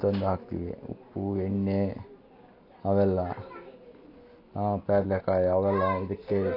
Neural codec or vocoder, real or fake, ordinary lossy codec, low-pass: none; real; AAC, 32 kbps; 5.4 kHz